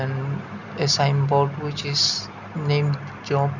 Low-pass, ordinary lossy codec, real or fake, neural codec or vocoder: 7.2 kHz; none; real; none